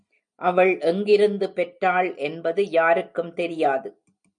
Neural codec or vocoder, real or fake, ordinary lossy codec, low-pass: none; real; MP3, 96 kbps; 9.9 kHz